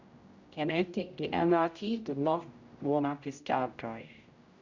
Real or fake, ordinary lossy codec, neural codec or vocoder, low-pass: fake; Opus, 64 kbps; codec, 16 kHz, 0.5 kbps, X-Codec, HuBERT features, trained on general audio; 7.2 kHz